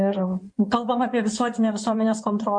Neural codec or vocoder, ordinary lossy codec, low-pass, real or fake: codec, 16 kHz in and 24 kHz out, 2.2 kbps, FireRedTTS-2 codec; MP3, 64 kbps; 9.9 kHz; fake